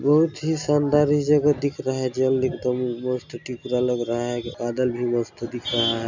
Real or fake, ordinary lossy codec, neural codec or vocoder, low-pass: real; none; none; 7.2 kHz